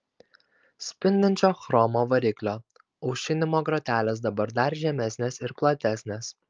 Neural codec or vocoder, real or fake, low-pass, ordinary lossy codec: none; real; 7.2 kHz; Opus, 24 kbps